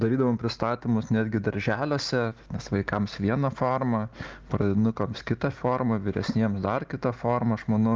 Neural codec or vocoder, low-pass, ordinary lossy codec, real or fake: none; 7.2 kHz; Opus, 24 kbps; real